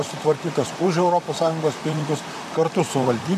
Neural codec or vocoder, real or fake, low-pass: vocoder, 44.1 kHz, 128 mel bands, Pupu-Vocoder; fake; 14.4 kHz